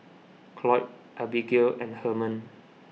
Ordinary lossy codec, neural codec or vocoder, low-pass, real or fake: none; none; none; real